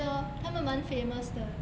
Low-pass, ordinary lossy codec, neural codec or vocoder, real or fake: none; none; none; real